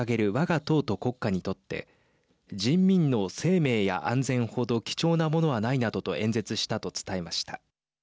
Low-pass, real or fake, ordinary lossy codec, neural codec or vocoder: none; real; none; none